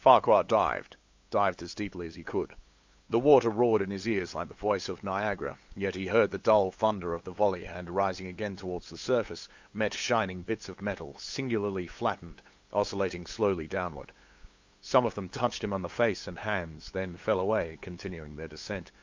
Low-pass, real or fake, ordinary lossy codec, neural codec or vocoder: 7.2 kHz; fake; MP3, 64 kbps; codec, 16 kHz, 8 kbps, FunCodec, trained on LibriTTS, 25 frames a second